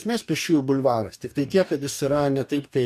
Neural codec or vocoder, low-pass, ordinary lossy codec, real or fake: codec, 44.1 kHz, 2.6 kbps, DAC; 14.4 kHz; MP3, 96 kbps; fake